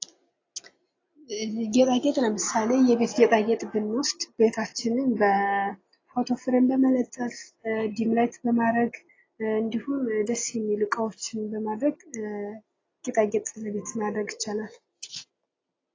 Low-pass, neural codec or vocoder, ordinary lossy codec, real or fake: 7.2 kHz; none; AAC, 32 kbps; real